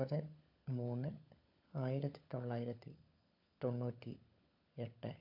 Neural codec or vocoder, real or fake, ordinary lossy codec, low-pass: codec, 16 kHz, 16 kbps, FunCodec, trained on LibriTTS, 50 frames a second; fake; AAC, 48 kbps; 5.4 kHz